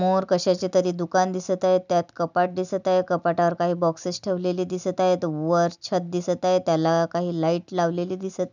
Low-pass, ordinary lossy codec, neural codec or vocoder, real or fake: 7.2 kHz; none; none; real